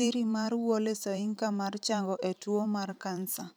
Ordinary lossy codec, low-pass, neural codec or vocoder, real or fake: none; none; vocoder, 44.1 kHz, 128 mel bands every 512 samples, BigVGAN v2; fake